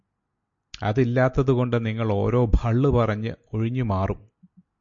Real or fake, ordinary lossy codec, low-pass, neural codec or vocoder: real; MP3, 48 kbps; 7.2 kHz; none